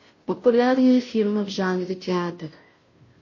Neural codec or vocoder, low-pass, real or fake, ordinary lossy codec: codec, 16 kHz, 0.5 kbps, FunCodec, trained on Chinese and English, 25 frames a second; 7.2 kHz; fake; MP3, 32 kbps